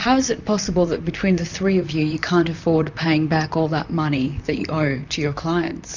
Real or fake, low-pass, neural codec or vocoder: fake; 7.2 kHz; vocoder, 44.1 kHz, 128 mel bands, Pupu-Vocoder